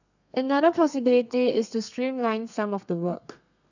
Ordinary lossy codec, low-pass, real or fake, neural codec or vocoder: none; 7.2 kHz; fake; codec, 32 kHz, 1.9 kbps, SNAC